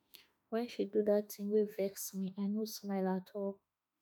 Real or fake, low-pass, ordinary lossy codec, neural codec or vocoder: fake; none; none; autoencoder, 48 kHz, 32 numbers a frame, DAC-VAE, trained on Japanese speech